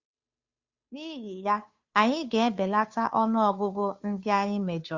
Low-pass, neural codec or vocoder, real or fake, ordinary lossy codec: 7.2 kHz; codec, 16 kHz, 2 kbps, FunCodec, trained on Chinese and English, 25 frames a second; fake; Opus, 64 kbps